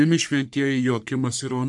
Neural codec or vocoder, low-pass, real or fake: codec, 44.1 kHz, 3.4 kbps, Pupu-Codec; 10.8 kHz; fake